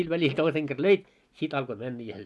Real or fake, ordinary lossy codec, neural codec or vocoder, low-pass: real; none; none; none